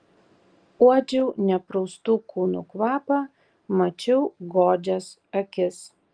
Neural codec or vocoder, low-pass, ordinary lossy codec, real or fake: none; 9.9 kHz; Opus, 32 kbps; real